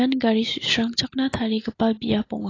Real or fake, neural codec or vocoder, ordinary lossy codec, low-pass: real; none; AAC, 32 kbps; 7.2 kHz